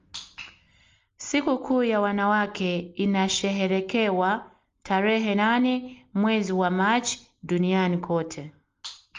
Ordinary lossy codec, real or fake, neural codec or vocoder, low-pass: Opus, 32 kbps; real; none; 7.2 kHz